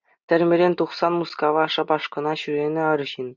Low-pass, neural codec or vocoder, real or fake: 7.2 kHz; none; real